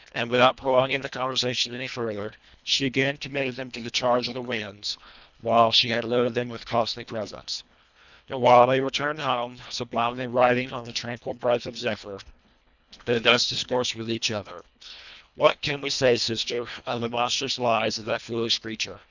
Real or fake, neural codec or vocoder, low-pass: fake; codec, 24 kHz, 1.5 kbps, HILCodec; 7.2 kHz